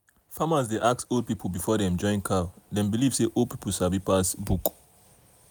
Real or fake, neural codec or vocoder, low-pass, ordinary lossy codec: real; none; none; none